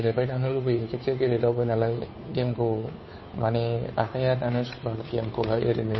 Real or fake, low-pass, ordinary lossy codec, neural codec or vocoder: fake; 7.2 kHz; MP3, 24 kbps; codec, 16 kHz, 2 kbps, FunCodec, trained on Chinese and English, 25 frames a second